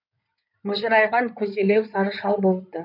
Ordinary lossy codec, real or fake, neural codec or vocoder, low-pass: none; fake; codec, 16 kHz in and 24 kHz out, 2.2 kbps, FireRedTTS-2 codec; 5.4 kHz